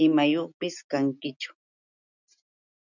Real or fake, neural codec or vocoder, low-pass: real; none; 7.2 kHz